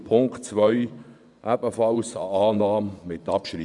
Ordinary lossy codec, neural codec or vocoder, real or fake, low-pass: none; vocoder, 24 kHz, 100 mel bands, Vocos; fake; 10.8 kHz